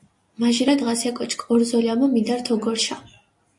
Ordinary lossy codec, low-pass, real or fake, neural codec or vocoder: MP3, 48 kbps; 10.8 kHz; real; none